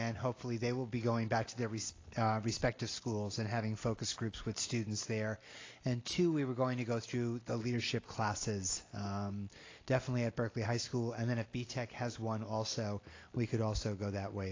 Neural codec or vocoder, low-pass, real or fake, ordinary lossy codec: none; 7.2 kHz; real; AAC, 32 kbps